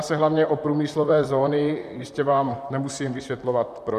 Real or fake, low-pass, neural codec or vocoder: fake; 14.4 kHz; vocoder, 44.1 kHz, 128 mel bands, Pupu-Vocoder